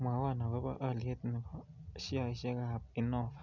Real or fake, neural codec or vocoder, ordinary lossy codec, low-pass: real; none; none; 7.2 kHz